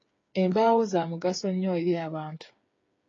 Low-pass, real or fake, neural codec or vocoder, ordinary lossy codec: 7.2 kHz; fake; codec, 16 kHz, 8 kbps, FreqCodec, smaller model; AAC, 32 kbps